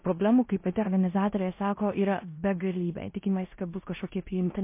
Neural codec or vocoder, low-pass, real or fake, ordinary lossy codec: codec, 16 kHz in and 24 kHz out, 0.9 kbps, LongCat-Audio-Codec, four codebook decoder; 3.6 kHz; fake; MP3, 24 kbps